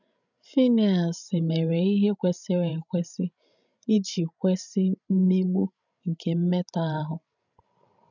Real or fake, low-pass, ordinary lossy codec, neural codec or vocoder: fake; 7.2 kHz; none; codec, 16 kHz, 16 kbps, FreqCodec, larger model